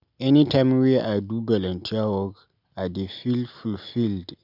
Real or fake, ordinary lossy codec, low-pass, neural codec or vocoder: real; none; 5.4 kHz; none